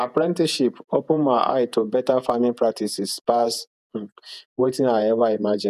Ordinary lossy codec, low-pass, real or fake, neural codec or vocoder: none; 14.4 kHz; fake; vocoder, 48 kHz, 128 mel bands, Vocos